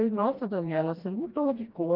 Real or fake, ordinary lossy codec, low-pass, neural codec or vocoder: fake; Opus, 24 kbps; 5.4 kHz; codec, 16 kHz, 1 kbps, FreqCodec, smaller model